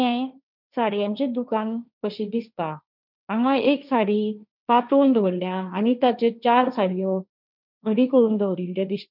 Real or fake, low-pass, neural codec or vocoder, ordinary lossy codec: fake; 5.4 kHz; codec, 16 kHz, 1.1 kbps, Voila-Tokenizer; none